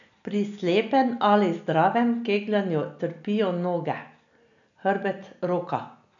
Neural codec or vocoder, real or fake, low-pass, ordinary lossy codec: none; real; 7.2 kHz; MP3, 96 kbps